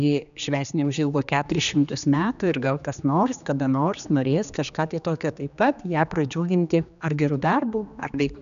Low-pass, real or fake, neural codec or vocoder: 7.2 kHz; fake; codec, 16 kHz, 2 kbps, X-Codec, HuBERT features, trained on general audio